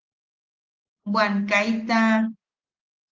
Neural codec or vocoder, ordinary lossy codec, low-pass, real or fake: none; Opus, 16 kbps; 7.2 kHz; real